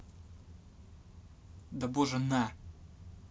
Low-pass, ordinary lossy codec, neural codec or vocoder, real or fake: none; none; none; real